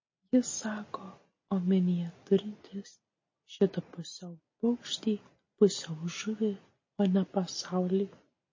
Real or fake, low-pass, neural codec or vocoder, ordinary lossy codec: real; 7.2 kHz; none; MP3, 32 kbps